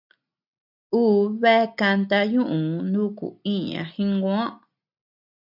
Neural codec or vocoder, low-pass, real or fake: none; 5.4 kHz; real